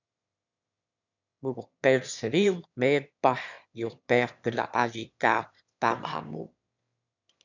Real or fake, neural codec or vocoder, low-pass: fake; autoencoder, 22.05 kHz, a latent of 192 numbers a frame, VITS, trained on one speaker; 7.2 kHz